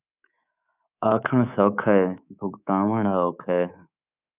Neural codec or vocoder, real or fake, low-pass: codec, 24 kHz, 3.1 kbps, DualCodec; fake; 3.6 kHz